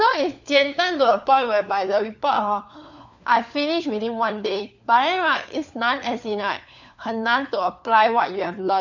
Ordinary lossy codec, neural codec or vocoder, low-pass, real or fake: none; codec, 16 kHz, 4 kbps, FunCodec, trained on LibriTTS, 50 frames a second; 7.2 kHz; fake